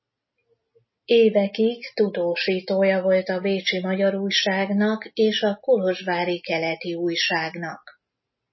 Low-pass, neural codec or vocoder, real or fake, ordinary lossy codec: 7.2 kHz; none; real; MP3, 24 kbps